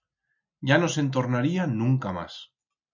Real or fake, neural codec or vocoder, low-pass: real; none; 7.2 kHz